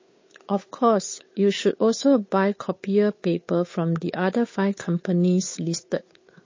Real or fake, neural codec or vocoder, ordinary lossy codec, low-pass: fake; codec, 16 kHz, 8 kbps, FunCodec, trained on Chinese and English, 25 frames a second; MP3, 32 kbps; 7.2 kHz